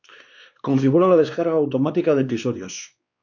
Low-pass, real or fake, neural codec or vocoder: 7.2 kHz; fake; codec, 16 kHz, 2 kbps, X-Codec, WavLM features, trained on Multilingual LibriSpeech